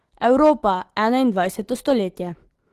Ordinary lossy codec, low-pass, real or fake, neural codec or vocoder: Opus, 16 kbps; 14.4 kHz; fake; autoencoder, 48 kHz, 128 numbers a frame, DAC-VAE, trained on Japanese speech